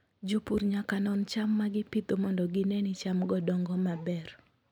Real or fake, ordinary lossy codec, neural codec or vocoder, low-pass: real; none; none; 19.8 kHz